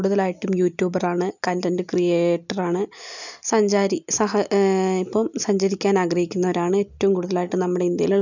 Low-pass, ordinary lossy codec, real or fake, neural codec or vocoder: 7.2 kHz; none; real; none